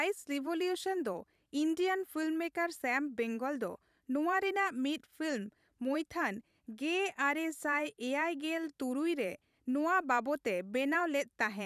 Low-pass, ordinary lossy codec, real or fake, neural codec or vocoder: 14.4 kHz; none; fake; vocoder, 44.1 kHz, 128 mel bands every 512 samples, BigVGAN v2